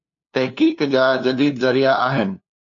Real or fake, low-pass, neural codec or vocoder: fake; 7.2 kHz; codec, 16 kHz, 2 kbps, FunCodec, trained on LibriTTS, 25 frames a second